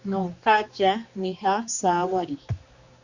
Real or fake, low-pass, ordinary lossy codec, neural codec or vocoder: fake; 7.2 kHz; Opus, 64 kbps; codec, 16 kHz, 2 kbps, X-Codec, HuBERT features, trained on general audio